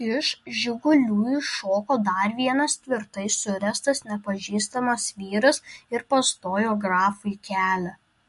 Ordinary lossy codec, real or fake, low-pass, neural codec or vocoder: MP3, 48 kbps; real; 14.4 kHz; none